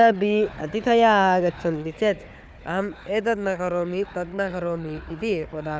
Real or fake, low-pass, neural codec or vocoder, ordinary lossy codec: fake; none; codec, 16 kHz, 4 kbps, FunCodec, trained on Chinese and English, 50 frames a second; none